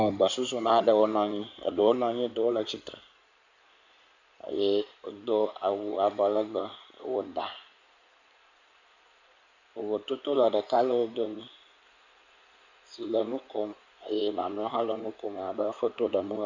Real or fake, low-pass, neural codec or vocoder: fake; 7.2 kHz; codec, 16 kHz in and 24 kHz out, 2.2 kbps, FireRedTTS-2 codec